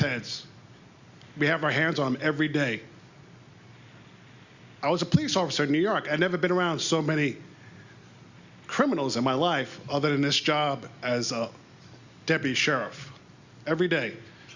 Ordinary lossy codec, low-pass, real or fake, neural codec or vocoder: Opus, 64 kbps; 7.2 kHz; real; none